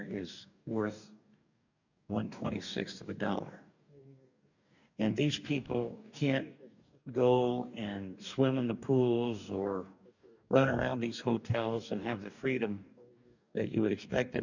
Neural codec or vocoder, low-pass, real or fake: codec, 44.1 kHz, 2.6 kbps, DAC; 7.2 kHz; fake